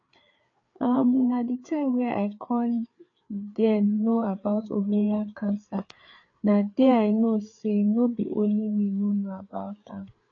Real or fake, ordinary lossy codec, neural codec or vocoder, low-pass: fake; AAC, 48 kbps; codec, 16 kHz, 4 kbps, FreqCodec, larger model; 7.2 kHz